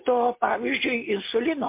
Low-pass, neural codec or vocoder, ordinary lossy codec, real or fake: 3.6 kHz; none; MP3, 24 kbps; real